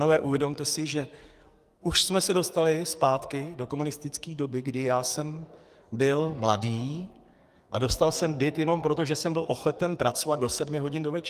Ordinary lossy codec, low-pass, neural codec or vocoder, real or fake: Opus, 32 kbps; 14.4 kHz; codec, 44.1 kHz, 2.6 kbps, SNAC; fake